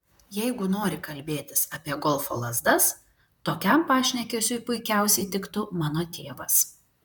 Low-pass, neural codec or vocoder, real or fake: 19.8 kHz; vocoder, 44.1 kHz, 128 mel bands, Pupu-Vocoder; fake